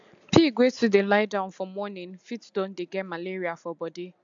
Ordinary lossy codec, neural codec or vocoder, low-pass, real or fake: AAC, 64 kbps; none; 7.2 kHz; real